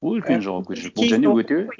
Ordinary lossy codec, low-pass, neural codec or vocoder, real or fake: none; none; none; real